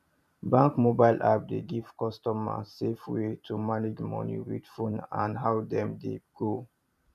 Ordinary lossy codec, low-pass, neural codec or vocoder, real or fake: MP3, 96 kbps; 14.4 kHz; vocoder, 44.1 kHz, 128 mel bands every 512 samples, BigVGAN v2; fake